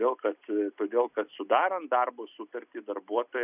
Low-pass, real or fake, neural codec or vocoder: 3.6 kHz; real; none